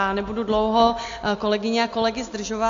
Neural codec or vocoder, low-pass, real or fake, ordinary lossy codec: none; 7.2 kHz; real; AAC, 48 kbps